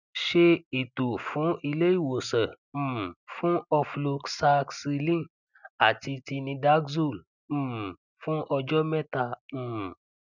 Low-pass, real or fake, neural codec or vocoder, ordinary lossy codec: 7.2 kHz; real; none; none